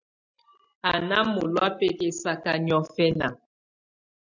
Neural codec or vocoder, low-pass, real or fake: none; 7.2 kHz; real